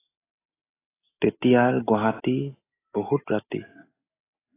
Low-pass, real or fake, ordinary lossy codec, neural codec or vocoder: 3.6 kHz; real; AAC, 16 kbps; none